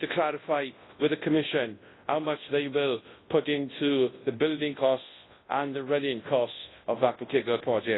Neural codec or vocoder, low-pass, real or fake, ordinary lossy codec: codec, 24 kHz, 0.9 kbps, WavTokenizer, large speech release; 7.2 kHz; fake; AAC, 16 kbps